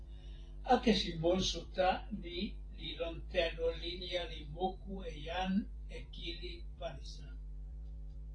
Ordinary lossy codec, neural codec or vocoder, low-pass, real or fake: AAC, 32 kbps; vocoder, 44.1 kHz, 128 mel bands every 512 samples, BigVGAN v2; 9.9 kHz; fake